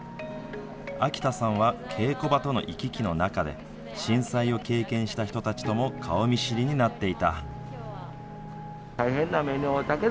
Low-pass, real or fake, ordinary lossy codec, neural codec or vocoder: none; real; none; none